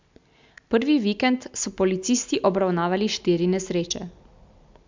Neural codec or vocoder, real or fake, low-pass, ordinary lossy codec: none; real; 7.2 kHz; MP3, 64 kbps